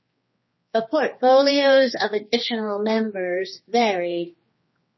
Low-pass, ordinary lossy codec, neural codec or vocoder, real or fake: 7.2 kHz; MP3, 24 kbps; codec, 16 kHz, 2 kbps, X-Codec, HuBERT features, trained on general audio; fake